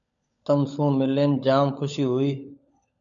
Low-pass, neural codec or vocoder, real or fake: 7.2 kHz; codec, 16 kHz, 16 kbps, FunCodec, trained on LibriTTS, 50 frames a second; fake